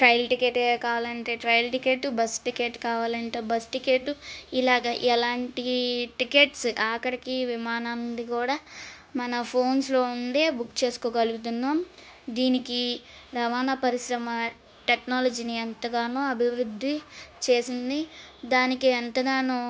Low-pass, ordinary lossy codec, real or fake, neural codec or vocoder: none; none; fake; codec, 16 kHz, 0.9 kbps, LongCat-Audio-Codec